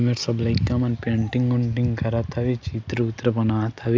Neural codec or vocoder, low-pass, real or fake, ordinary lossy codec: none; none; real; none